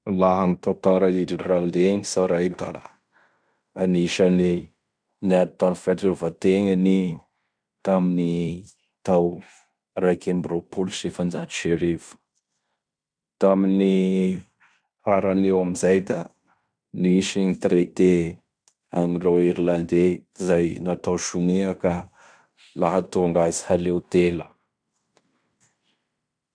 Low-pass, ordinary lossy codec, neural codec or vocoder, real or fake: 9.9 kHz; none; codec, 16 kHz in and 24 kHz out, 0.9 kbps, LongCat-Audio-Codec, fine tuned four codebook decoder; fake